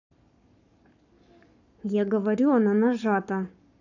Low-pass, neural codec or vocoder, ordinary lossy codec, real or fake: 7.2 kHz; codec, 44.1 kHz, 7.8 kbps, Pupu-Codec; none; fake